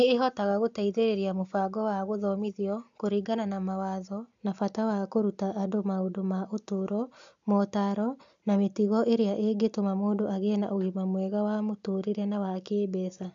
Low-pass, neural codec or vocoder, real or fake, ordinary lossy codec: 7.2 kHz; none; real; AAC, 64 kbps